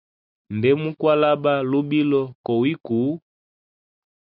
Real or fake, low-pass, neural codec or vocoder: real; 5.4 kHz; none